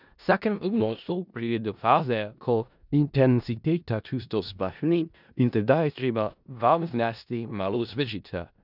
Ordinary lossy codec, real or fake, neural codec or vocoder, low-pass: none; fake; codec, 16 kHz in and 24 kHz out, 0.4 kbps, LongCat-Audio-Codec, four codebook decoder; 5.4 kHz